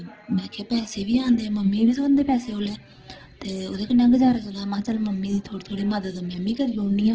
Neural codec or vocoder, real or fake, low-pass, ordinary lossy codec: none; real; 7.2 kHz; Opus, 16 kbps